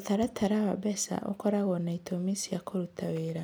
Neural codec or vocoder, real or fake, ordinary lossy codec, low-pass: none; real; none; none